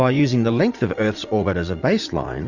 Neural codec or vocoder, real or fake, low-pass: vocoder, 44.1 kHz, 80 mel bands, Vocos; fake; 7.2 kHz